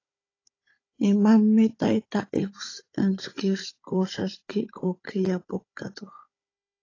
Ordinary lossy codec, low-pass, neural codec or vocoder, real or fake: AAC, 32 kbps; 7.2 kHz; codec, 16 kHz, 16 kbps, FunCodec, trained on Chinese and English, 50 frames a second; fake